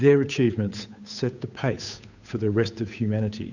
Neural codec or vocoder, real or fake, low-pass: codec, 16 kHz, 8 kbps, FunCodec, trained on Chinese and English, 25 frames a second; fake; 7.2 kHz